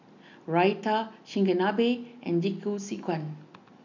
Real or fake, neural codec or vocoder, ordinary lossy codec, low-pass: real; none; none; 7.2 kHz